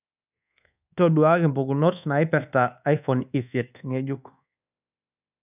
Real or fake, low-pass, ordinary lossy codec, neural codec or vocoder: fake; 3.6 kHz; none; codec, 24 kHz, 1.2 kbps, DualCodec